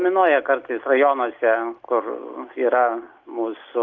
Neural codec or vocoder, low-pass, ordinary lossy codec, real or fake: none; 7.2 kHz; Opus, 24 kbps; real